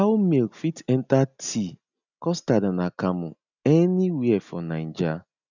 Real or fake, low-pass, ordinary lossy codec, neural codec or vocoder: real; 7.2 kHz; none; none